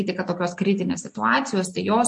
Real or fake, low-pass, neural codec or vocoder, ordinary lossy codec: real; 10.8 kHz; none; MP3, 48 kbps